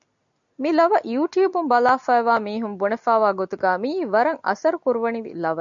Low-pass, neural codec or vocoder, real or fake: 7.2 kHz; none; real